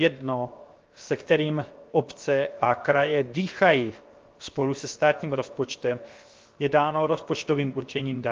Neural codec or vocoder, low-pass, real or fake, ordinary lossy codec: codec, 16 kHz, 0.7 kbps, FocalCodec; 7.2 kHz; fake; Opus, 32 kbps